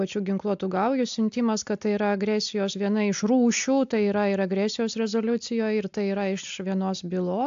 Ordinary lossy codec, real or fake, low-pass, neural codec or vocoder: AAC, 64 kbps; real; 7.2 kHz; none